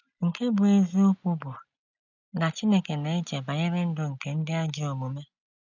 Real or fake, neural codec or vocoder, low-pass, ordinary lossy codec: real; none; 7.2 kHz; none